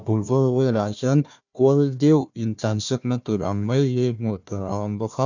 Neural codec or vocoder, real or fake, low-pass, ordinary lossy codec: codec, 16 kHz, 1 kbps, FunCodec, trained on Chinese and English, 50 frames a second; fake; 7.2 kHz; none